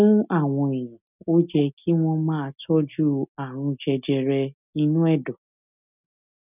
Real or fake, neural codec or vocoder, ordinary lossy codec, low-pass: real; none; none; 3.6 kHz